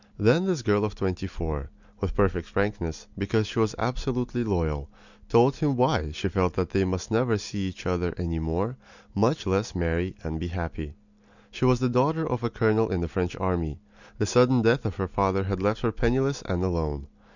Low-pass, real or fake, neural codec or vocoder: 7.2 kHz; real; none